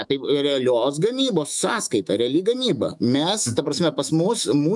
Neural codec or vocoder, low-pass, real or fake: autoencoder, 48 kHz, 128 numbers a frame, DAC-VAE, trained on Japanese speech; 10.8 kHz; fake